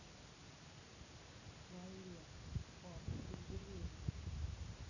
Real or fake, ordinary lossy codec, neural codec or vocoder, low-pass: real; none; none; 7.2 kHz